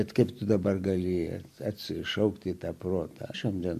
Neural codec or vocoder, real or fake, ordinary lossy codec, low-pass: none; real; MP3, 64 kbps; 14.4 kHz